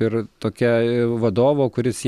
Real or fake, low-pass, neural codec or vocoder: real; 14.4 kHz; none